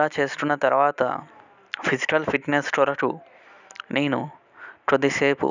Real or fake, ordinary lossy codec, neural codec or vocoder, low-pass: real; none; none; 7.2 kHz